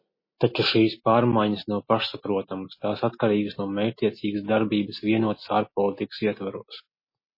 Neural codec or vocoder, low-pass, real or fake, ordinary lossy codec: none; 5.4 kHz; real; MP3, 24 kbps